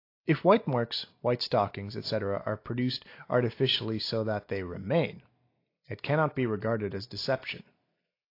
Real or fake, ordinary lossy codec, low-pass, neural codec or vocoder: real; AAC, 32 kbps; 5.4 kHz; none